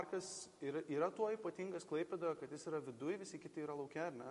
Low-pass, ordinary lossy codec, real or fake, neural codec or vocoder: 14.4 kHz; MP3, 48 kbps; real; none